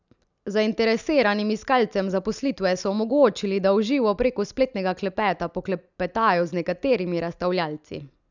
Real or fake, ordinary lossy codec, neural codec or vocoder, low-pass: real; none; none; 7.2 kHz